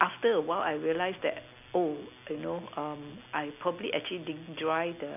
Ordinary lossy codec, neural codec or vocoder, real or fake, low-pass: none; none; real; 3.6 kHz